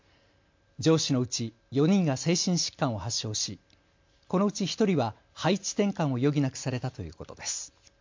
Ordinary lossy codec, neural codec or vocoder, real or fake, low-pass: MP3, 48 kbps; none; real; 7.2 kHz